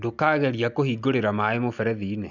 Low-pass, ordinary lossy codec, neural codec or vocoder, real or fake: 7.2 kHz; none; none; real